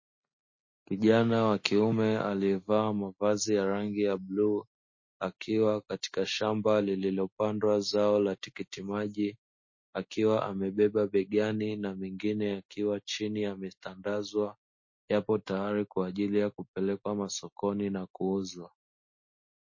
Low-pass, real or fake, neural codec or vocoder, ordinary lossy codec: 7.2 kHz; real; none; MP3, 32 kbps